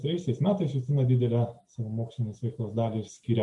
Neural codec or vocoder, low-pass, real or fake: none; 10.8 kHz; real